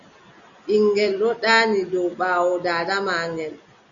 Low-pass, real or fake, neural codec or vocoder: 7.2 kHz; real; none